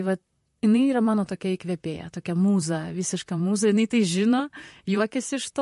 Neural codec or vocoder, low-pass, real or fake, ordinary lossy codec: vocoder, 44.1 kHz, 128 mel bands, Pupu-Vocoder; 14.4 kHz; fake; MP3, 48 kbps